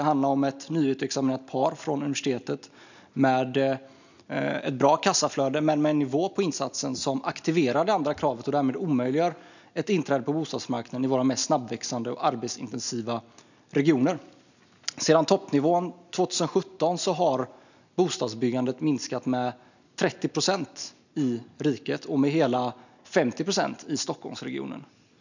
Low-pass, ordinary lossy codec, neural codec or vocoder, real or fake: 7.2 kHz; none; none; real